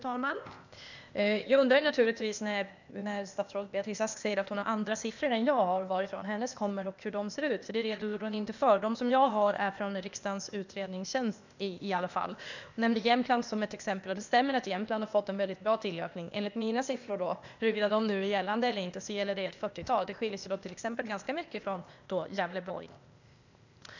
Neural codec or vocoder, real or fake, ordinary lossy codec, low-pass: codec, 16 kHz, 0.8 kbps, ZipCodec; fake; none; 7.2 kHz